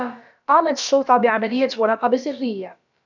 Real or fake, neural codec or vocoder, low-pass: fake; codec, 16 kHz, about 1 kbps, DyCAST, with the encoder's durations; 7.2 kHz